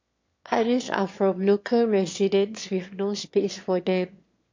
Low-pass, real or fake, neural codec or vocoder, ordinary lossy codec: 7.2 kHz; fake; autoencoder, 22.05 kHz, a latent of 192 numbers a frame, VITS, trained on one speaker; MP3, 48 kbps